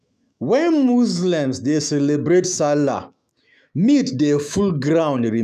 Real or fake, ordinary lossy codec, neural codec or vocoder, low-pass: fake; none; autoencoder, 48 kHz, 128 numbers a frame, DAC-VAE, trained on Japanese speech; 14.4 kHz